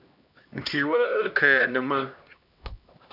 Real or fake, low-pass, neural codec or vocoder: fake; 5.4 kHz; codec, 16 kHz, 1 kbps, X-Codec, HuBERT features, trained on LibriSpeech